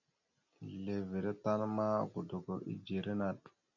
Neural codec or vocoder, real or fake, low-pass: none; real; 7.2 kHz